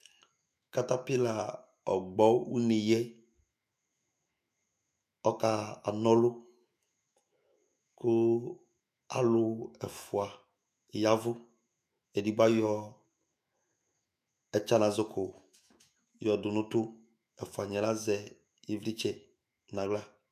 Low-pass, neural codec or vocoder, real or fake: 14.4 kHz; autoencoder, 48 kHz, 128 numbers a frame, DAC-VAE, trained on Japanese speech; fake